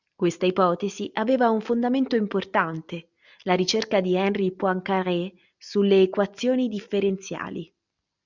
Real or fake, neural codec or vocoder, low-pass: real; none; 7.2 kHz